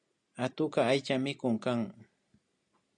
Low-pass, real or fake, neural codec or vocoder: 9.9 kHz; real; none